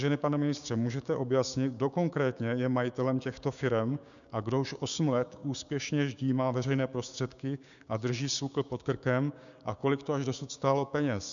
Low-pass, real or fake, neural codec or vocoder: 7.2 kHz; fake; codec, 16 kHz, 6 kbps, DAC